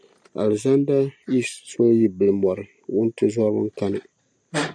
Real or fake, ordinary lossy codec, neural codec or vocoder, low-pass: real; MP3, 96 kbps; none; 9.9 kHz